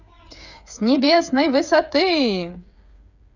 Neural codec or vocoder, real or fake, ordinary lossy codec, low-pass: codec, 16 kHz, 8 kbps, FreqCodec, smaller model; fake; none; 7.2 kHz